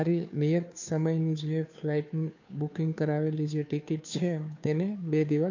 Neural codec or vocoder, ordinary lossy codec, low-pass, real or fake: codec, 16 kHz, 2 kbps, FunCodec, trained on Chinese and English, 25 frames a second; none; 7.2 kHz; fake